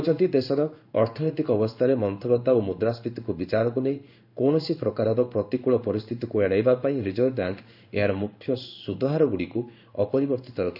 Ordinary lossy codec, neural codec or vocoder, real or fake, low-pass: none; codec, 16 kHz in and 24 kHz out, 1 kbps, XY-Tokenizer; fake; 5.4 kHz